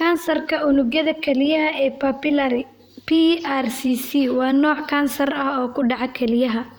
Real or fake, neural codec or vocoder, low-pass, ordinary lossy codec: fake; vocoder, 44.1 kHz, 128 mel bands, Pupu-Vocoder; none; none